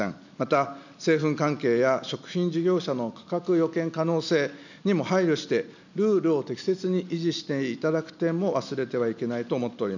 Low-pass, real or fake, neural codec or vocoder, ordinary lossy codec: 7.2 kHz; real; none; none